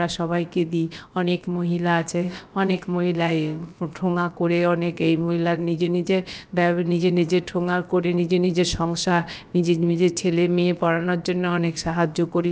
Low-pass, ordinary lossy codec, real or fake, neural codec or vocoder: none; none; fake; codec, 16 kHz, about 1 kbps, DyCAST, with the encoder's durations